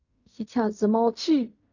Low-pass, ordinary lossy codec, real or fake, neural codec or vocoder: 7.2 kHz; none; fake; codec, 16 kHz in and 24 kHz out, 0.4 kbps, LongCat-Audio-Codec, fine tuned four codebook decoder